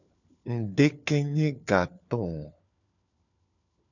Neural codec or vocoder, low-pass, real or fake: codec, 16 kHz, 4 kbps, FunCodec, trained on LibriTTS, 50 frames a second; 7.2 kHz; fake